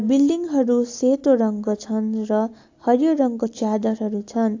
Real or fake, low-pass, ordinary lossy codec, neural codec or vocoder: real; 7.2 kHz; AAC, 48 kbps; none